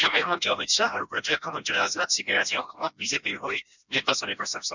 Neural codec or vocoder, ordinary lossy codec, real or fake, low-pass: codec, 16 kHz, 1 kbps, FreqCodec, smaller model; none; fake; 7.2 kHz